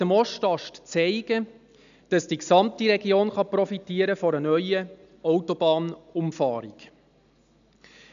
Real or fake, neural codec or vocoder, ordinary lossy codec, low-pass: real; none; none; 7.2 kHz